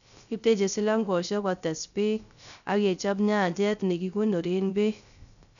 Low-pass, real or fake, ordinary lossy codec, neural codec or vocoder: 7.2 kHz; fake; none; codec, 16 kHz, 0.3 kbps, FocalCodec